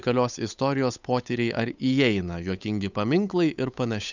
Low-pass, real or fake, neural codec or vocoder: 7.2 kHz; fake; codec, 16 kHz, 8 kbps, FunCodec, trained on Chinese and English, 25 frames a second